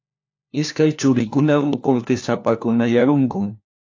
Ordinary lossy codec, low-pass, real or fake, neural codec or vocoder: AAC, 48 kbps; 7.2 kHz; fake; codec, 16 kHz, 1 kbps, FunCodec, trained on LibriTTS, 50 frames a second